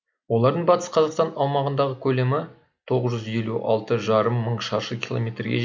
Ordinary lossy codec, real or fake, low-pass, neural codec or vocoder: none; real; none; none